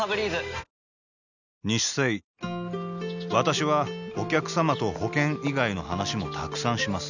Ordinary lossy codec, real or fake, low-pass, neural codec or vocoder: none; real; 7.2 kHz; none